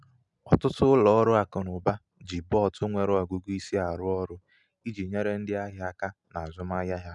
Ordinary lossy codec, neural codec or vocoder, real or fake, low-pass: none; none; real; 10.8 kHz